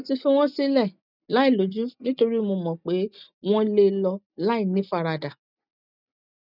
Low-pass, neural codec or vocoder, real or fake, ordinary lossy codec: 5.4 kHz; none; real; none